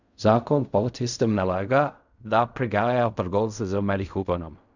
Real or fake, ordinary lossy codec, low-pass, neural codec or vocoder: fake; none; 7.2 kHz; codec, 16 kHz in and 24 kHz out, 0.4 kbps, LongCat-Audio-Codec, fine tuned four codebook decoder